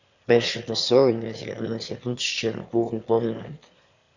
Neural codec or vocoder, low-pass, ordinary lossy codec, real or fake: autoencoder, 22.05 kHz, a latent of 192 numbers a frame, VITS, trained on one speaker; 7.2 kHz; Opus, 64 kbps; fake